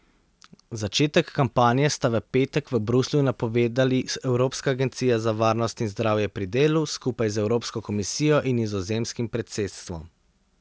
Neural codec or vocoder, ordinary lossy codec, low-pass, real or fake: none; none; none; real